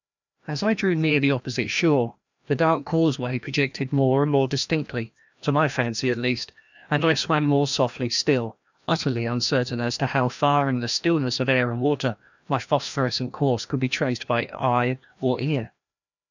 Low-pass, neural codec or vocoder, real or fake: 7.2 kHz; codec, 16 kHz, 1 kbps, FreqCodec, larger model; fake